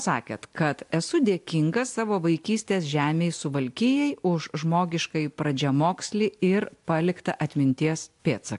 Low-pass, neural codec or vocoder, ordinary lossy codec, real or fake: 10.8 kHz; none; AAC, 64 kbps; real